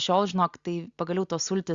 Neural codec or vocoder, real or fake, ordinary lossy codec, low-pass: none; real; Opus, 64 kbps; 7.2 kHz